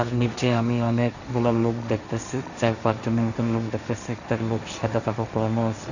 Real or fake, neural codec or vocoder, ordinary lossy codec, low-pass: fake; codec, 16 kHz, 1.1 kbps, Voila-Tokenizer; none; 7.2 kHz